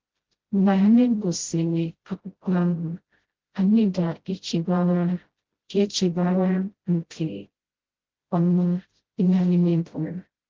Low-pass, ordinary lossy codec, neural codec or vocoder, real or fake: 7.2 kHz; Opus, 16 kbps; codec, 16 kHz, 0.5 kbps, FreqCodec, smaller model; fake